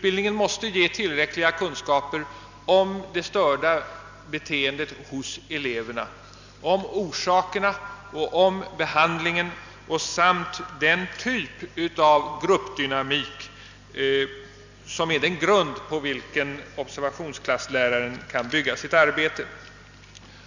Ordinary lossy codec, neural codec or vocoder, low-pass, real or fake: none; none; 7.2 kHz; real